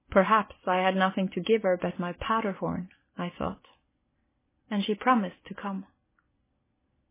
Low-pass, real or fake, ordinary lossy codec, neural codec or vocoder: 3.6 kHz; real; MP3, 16 kbps; none